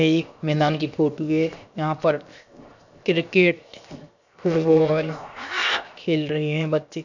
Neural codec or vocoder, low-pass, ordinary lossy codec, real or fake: codec, 16 kHz, 0.7 kbps, FocalCodec; 7.2 kHz; none; fake